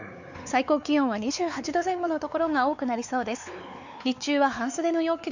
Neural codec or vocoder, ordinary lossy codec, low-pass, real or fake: codec, 16 kHz, 4 kbps, X-Codec, WavLM features, trained on Multilingual LibriSpeech; none; 7.2 kHz; fake